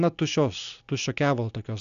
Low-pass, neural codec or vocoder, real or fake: 7.2 kHz; none; real